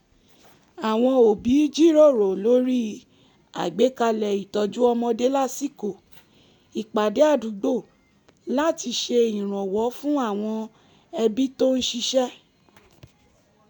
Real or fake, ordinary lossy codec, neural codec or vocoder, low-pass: real; none; none; 19.8 kHz